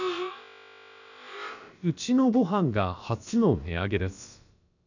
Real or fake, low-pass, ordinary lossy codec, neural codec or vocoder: fake; 7.2 kHz; none; codec, 16 kHz, about 1 kbps, DyCAST, with the encoder's durations